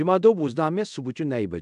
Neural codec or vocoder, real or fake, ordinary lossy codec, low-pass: codec, 24 kHz, 0.5 kbps, DualCodec; fake; AAC, 96 kbps; 10.8 kHz